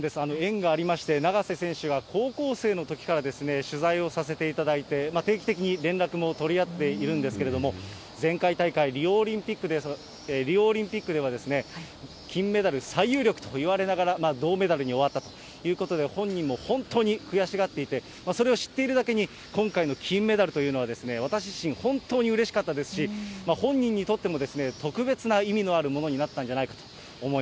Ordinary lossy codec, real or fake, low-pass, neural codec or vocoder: none; real; none; none